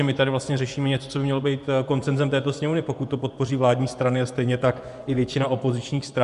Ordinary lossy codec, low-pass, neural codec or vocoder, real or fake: AAC, 96 kbps; 10.8 kHz; vocoder, 24 kHz, 100 mel bands, Vocos; fake